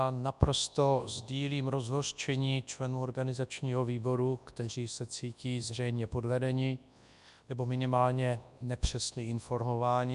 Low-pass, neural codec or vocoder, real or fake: 10.8 kHz; codec, 24 kHz, 0.9 kbps, WavTokenizer, large speech release; fake